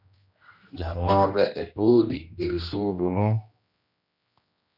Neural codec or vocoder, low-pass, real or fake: codec, 16 kHz, 1 kbps, X-Codec, HuBERT features, trained on general audio; 5.4 kHz; fake